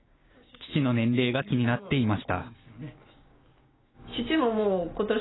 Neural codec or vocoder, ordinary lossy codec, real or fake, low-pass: codec, 16 kHz, 6 kbps, DAC; AAC, 16 kbps; fake; 7.2 kHz